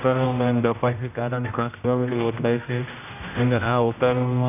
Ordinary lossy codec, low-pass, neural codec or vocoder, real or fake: none; 3.6 kHz; codec, 16 kHz, 0.5 kbps, X-Codec, HuBERT features, trained on general audio; fake